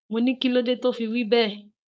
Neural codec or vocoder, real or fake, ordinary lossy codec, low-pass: codec, 16 kHz, 4.8 kbps, FACodec; fake; none; none